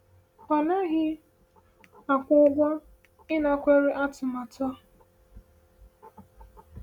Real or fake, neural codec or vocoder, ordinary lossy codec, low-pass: real; none; none; 19.8 kHz